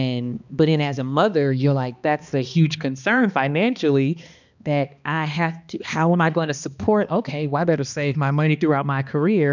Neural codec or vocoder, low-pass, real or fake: codec, 16 kHz, 2 kbps, X-Codec, HuBERT features, trained on balanced general audio; 7.2 kHz; fake